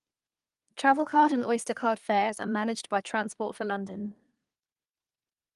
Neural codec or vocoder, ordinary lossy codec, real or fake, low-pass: codec, 24 kHz, 1 kbps, SNAC; Opus, 32 kbps; fake; 10.8 kHz